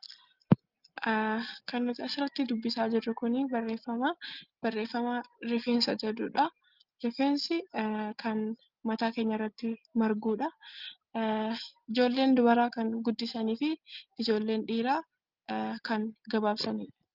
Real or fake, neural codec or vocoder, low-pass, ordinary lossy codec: real; none; 5.4 kHz; Opus, 32 kbps